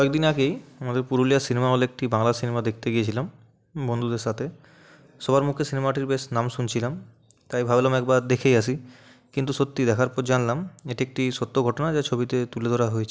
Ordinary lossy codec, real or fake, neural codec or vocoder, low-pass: none; real; none; none